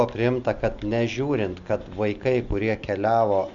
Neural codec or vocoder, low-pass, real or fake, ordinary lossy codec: none; 7.2 kHz; real; MP3, 64 kbps